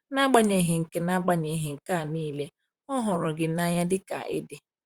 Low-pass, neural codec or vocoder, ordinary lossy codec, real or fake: 19.8 kHz; vocoder, 44.1 kHz, 128 mel bands, Pupu-Vocoder; Opus, 64 kbps; fake